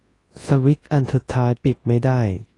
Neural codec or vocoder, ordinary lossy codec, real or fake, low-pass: codec, 24 kHz, 0.9 kbps, WavTokenizer, large speech release; AAC, 32 kbps; fake; 10.8 kHz